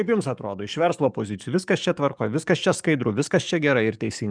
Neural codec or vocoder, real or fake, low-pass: codec, 44.1 kHz, 7.8 kbps, DAC; fake; 9.9 kHz